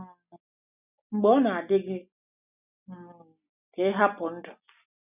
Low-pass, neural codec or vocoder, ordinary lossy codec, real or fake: 3.6 kHz; none; none; real